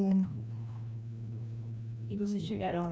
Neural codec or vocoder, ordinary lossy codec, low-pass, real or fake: codec, 16 kHz, 1 kbps, FreqCodec, larger model; none; none; fake